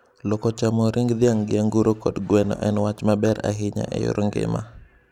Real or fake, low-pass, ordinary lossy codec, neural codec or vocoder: real; 19.8 kHz; none; none